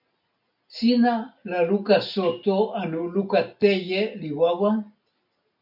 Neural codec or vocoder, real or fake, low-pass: none; real; 5.4 kHz